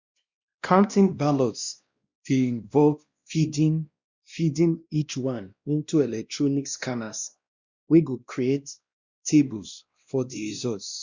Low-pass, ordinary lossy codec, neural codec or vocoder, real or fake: 7.2 kHz; Opus, 64 kbps; codec, 16 kHz, 1 kbps, X-Codec, WavLM features, trained on Multilingual LibriSpeech; fake